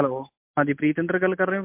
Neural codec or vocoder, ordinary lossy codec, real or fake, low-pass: none; MP3, 32 kbps; real; 3.6 kHz